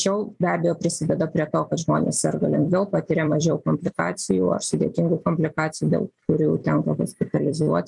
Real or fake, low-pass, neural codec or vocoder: fake; 10.8 kHz; vocoder, 44.1 kHz, 128 mel bands every 256 samples, BigVGAN v2